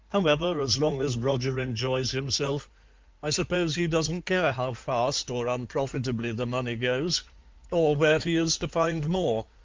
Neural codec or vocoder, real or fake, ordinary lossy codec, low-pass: codec, 16 kHz in and 24 kHz out, 2.2 kbps, FireRedTTS-2 codec; fake; Opus, 32 kbps; 7.2 kHz